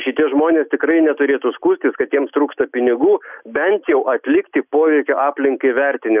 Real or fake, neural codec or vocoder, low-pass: real; none; 3.6 kHz